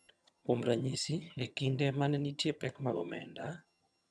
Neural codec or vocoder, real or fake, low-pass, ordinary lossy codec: vocoder, 22.05 kHz, 80 mel bands, HiFi-GAN; fake; none; none